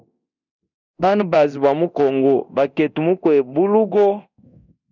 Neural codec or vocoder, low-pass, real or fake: codec, 24 kHz, 0.9 kbps, DualCodec; 7.2 kHz; fake